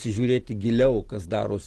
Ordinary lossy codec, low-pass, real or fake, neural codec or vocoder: Opus, 16 kbps; 10.8 kHz; real; none